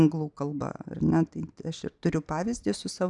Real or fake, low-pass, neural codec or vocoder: real; 10.8 kHz; none